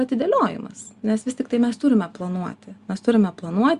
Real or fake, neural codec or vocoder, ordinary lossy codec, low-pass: real; none; Opus, 64 kbps; 10.8 kHz